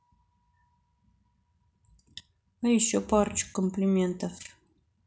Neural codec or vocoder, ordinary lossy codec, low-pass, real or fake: none; none; none; real